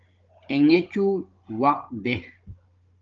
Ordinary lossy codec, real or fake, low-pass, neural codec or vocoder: Opus, 24 kbps; fake; 7.2 kHz; codec, 16 kHz, 16 kbps, FunCodec, trained on Chinese and English, 50 frames a second